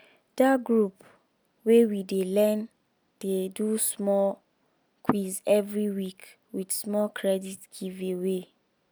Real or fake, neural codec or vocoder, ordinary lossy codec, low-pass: real; none; none; none